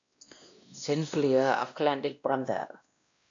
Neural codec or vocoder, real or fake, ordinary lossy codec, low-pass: codec, 16 kHz, 1 kbps, X-Codec, WavLM features, trained on Multilingual LibriSpeech; fake; AAC, 64 kbps; 7.2 kHz